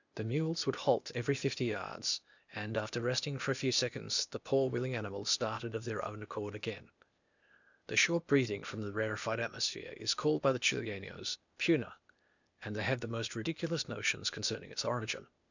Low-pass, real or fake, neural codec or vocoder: 7.2 kHz; fake; codec, 16 kHz, 0.8 kbps, ZipCodec